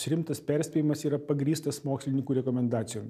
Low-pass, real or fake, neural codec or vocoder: 14.4 kHz; real; none